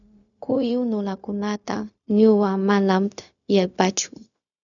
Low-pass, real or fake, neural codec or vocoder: 7.2 kHz; fake; codec, 16 kHz, 0.4 kbps, LongCat-Audio-Codec